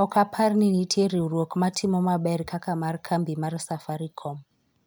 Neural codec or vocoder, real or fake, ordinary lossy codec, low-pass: none; real; none; none